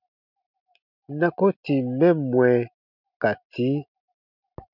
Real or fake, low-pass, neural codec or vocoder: real; 5.4 kHz; none